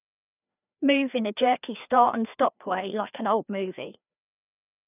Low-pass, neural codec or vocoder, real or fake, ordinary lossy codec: 3.6 kHz; codec, 16 kHz, 2 kbps, FreqCodec, larger model; fake; none